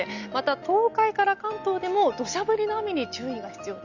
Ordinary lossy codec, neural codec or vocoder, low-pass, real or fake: none; none; 7.2 kHz; real